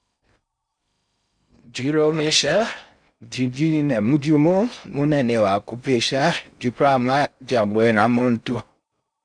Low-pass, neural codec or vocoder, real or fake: 9.9 kHz; codec, 16 kHz in and 24 kHz out, 0.6 kbps, FocalCodec, streaming, 2048 codes; fake